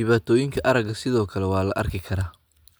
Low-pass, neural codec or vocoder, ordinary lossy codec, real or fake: none; none; none; real